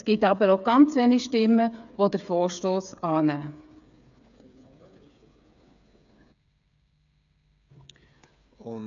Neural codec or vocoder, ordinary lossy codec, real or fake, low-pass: codec, 16 kHz, 8 kbps, FreqCodec, smaller model; none; fake; 7.2 kHz